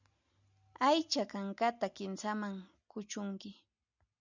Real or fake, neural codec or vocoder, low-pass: real; none; 7.2 kHz